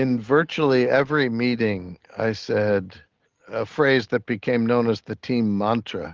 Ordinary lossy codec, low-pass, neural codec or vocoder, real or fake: Opus, 16 kbps; 7.2 kHz; none; real